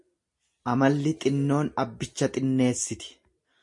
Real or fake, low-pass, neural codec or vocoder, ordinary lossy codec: real; 10.8 kHz; none; MP3, 48 kbps